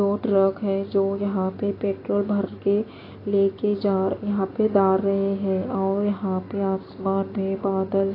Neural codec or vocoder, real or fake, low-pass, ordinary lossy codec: none; real; 5.4 kHz; AAC, 24 kbps